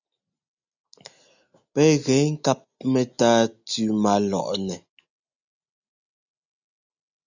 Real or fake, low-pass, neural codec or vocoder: real; 7.2 kHz; none